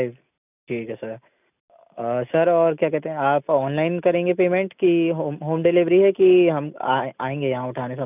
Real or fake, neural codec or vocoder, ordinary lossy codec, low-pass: real; none; none; 3.6 kHz